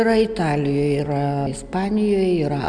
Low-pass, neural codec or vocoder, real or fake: 9.9 kHz; none; real